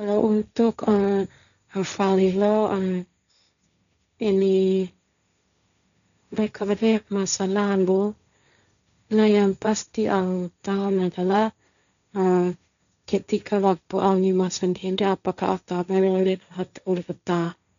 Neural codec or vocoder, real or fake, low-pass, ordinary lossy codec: codec, 16 kHz, 1.1 kbps, Voila-Tokenizer; fake; 7.2 kHz; none